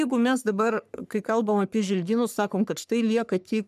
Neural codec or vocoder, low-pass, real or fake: codec, 44.1 kHz, 3.4 kbps, Pupu-Codec; 14.4 kHz; fake